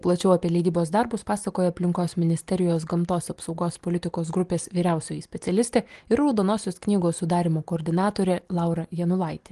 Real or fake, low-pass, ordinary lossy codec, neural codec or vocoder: real; 10.8 kHz; Opus, 24 kbps; none